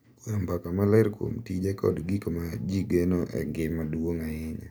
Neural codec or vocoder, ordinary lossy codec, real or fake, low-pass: none; none; real; none